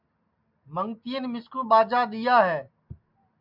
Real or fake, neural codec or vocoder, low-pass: real; none; 5.4 kHz